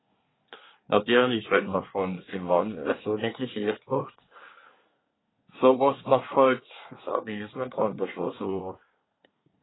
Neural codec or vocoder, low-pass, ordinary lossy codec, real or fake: codec, 24 kHz, 1 kbps, SNAC; 7.2 kHz; AAC, 16 kbps; fake